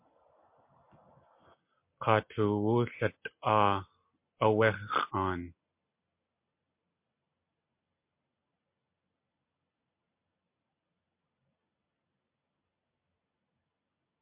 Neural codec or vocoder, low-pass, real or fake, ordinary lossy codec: none; 3.6 kHz; real; MP3, 32 kbps